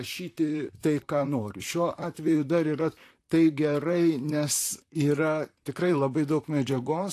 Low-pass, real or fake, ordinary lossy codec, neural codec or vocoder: 14.4 kHz; fake; AAC, 64 kbps; vocoder, 44.1 kHz, 128 mel bands, Pupu-Vocoder